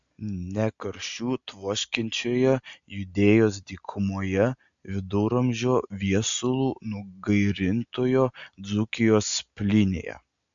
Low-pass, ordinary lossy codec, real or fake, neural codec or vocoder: 7.2 kHz; MP3, 64 kbps; real; none